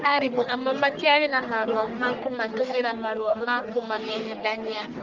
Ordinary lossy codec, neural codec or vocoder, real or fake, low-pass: Opus, 24 kbps; codec, 44.1 kHz, 1.7 kbps, Pupu-Codec; fake; 7.2 kHz